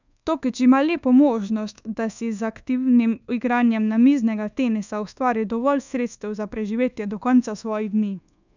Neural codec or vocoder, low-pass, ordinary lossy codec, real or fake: codec, 24 kHz, 1.2 kbps, DualCodec; 7.2 kHz; none; fake